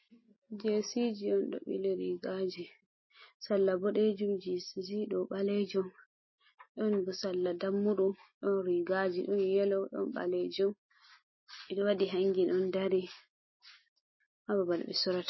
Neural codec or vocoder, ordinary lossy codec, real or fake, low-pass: none; MP3, 24 kbps; real; 7.2 kHz